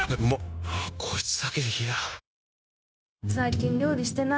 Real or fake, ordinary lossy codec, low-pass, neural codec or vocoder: fake; none; none; codec, 16 kHz, 0.9 kbps, LongCat-Audio-Codec